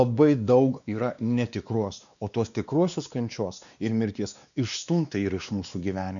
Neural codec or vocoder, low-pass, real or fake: codec, 16 kHz, 2 kbps, X-Codec, WavLM features, trained on Multilingual LibriSpeech; 7.2 kHz; fake